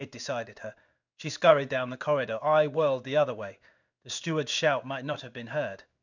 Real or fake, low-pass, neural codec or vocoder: fake; 7.2 kHz; codec, 16 kHz in and 24 kHz out, 1 kbps, XY-Tokenizer